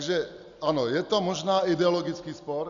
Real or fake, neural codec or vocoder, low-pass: real; none; 7.2 kHz